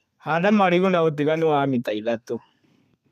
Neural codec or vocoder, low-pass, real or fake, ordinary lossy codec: codec, 32 kHz, 1.9 kbps, SNAC; 14.4 kHz; fake; none